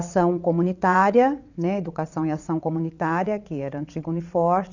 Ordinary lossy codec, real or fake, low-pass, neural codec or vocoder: none; fake; 7.2 kHz; vocoder, 22.05 kHz, 80 mel bands, WaveNeXt